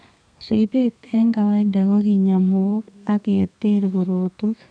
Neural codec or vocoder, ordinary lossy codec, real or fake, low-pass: codec, 32 kHz, 1.9 kbps, SNAC; none; fake; 9.9 kHz